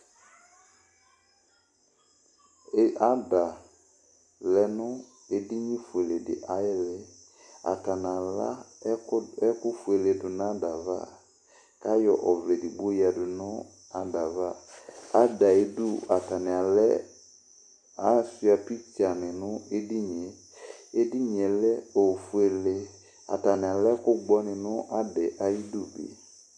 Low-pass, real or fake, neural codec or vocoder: 9.9 kHz; real; none